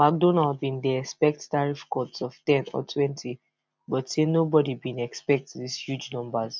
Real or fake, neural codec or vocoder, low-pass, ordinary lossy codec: real; none; none; none